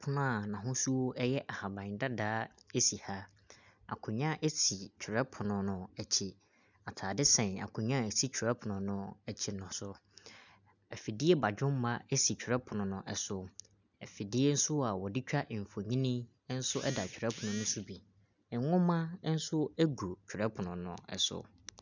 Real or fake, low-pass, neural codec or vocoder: real; 7.2 kHz; none